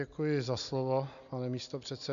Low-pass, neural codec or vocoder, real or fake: 7.2 kHz; none; real